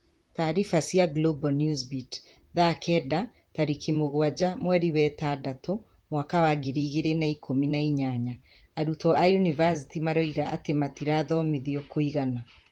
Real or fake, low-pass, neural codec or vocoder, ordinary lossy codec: fake; 19.8 kHz; vocoder, 44.1 kHz, 128 mel bands, Pupu-Vocoder; Opus, 24 kbps